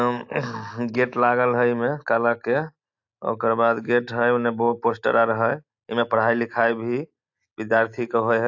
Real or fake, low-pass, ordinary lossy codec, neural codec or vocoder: real; 7.2 kHz; AAC, 48 kbps; none